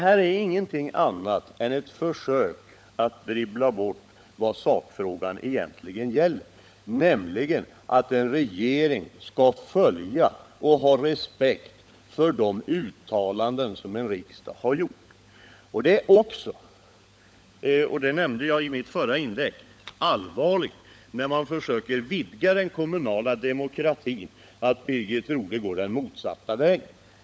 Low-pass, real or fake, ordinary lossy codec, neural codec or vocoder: none; fake; none; codec, 16 kHz, 16 kbps, FunCodec, trained on LibriTTS, 50 frames a second